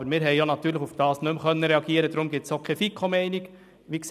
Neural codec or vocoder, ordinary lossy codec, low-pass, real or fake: none; none; 14.4 kHz; real